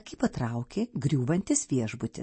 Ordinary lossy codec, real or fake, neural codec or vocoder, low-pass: MP3, 32 kbps; real; none; 9.9 kHz